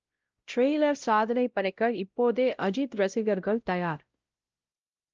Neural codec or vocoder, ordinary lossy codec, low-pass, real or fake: codec, 16 kHz, 0.5 kbps, X-Codec, WavLM features, trained on Multilingual LibriSpeech; Opus, 24 kbps; 7.2 kHz; fake